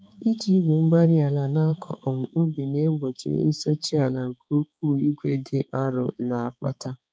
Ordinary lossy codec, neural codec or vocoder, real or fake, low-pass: none; codec, 16 kHz, 4 kbps, X-Codec, HuBERT features, trained on balanced general audio; fake; none